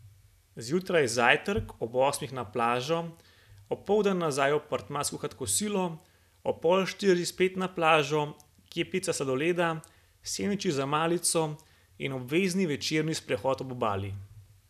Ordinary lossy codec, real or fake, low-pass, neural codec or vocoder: none; real; 14.4 kHz; none